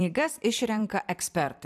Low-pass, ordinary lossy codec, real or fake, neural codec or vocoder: 14.4 kHz; AAC, 96 kbps; fake; vocoder, 44.1 kHz, 128 mel bands every 256 samples, BigVGAN v2